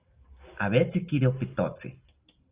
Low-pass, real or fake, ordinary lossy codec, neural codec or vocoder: 3.6 kHz; real; Opus, 24 kbps; none